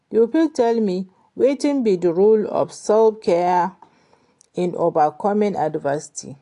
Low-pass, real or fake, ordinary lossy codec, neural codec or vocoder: 10.8 kHz; real; AAC, 48 kbps; none